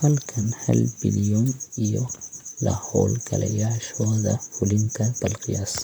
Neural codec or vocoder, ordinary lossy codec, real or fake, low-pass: vocoder, 44.1 kHz, 128 mel bands, Pupu-Vocoder; none; fake; none